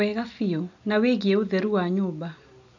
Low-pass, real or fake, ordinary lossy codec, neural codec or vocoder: 7.2 kHz; real; none; none